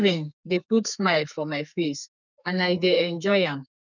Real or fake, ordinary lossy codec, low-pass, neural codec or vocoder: fake; none; 7.2 kHz; codec, 32 kHz, 1.9 kbps, SNAC